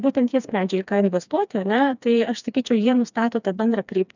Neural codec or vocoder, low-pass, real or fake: codec, 16 kHz, 2 kbps, FreqCodec, smaller model; 7.2 kHz; fake